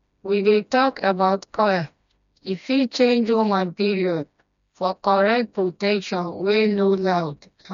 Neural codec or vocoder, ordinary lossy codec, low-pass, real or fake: codec, 16 kHz, 1 kbps, FreqCodec, smaller model; none; 7.2 kHz; fake